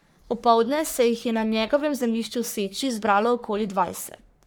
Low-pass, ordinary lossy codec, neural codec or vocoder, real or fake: none; none; codec, 44.1 kHz, 3.4 kbps, Pupu-Codec; fake